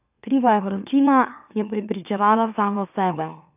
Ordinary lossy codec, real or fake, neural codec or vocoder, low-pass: none; fake; autoencoder, 44.1 kHz, a latent of 192 numbers a frame, MeloTTS; 3.6 kHz